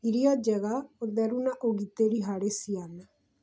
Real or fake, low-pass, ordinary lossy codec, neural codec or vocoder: real; none; none; none